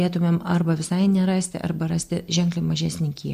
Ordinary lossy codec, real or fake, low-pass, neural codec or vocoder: AAC, 96 kbps; real; 14.4 kHz; none